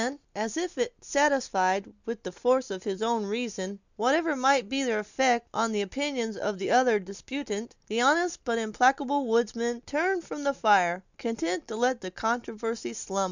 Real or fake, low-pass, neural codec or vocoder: real; 7.2 kHz; none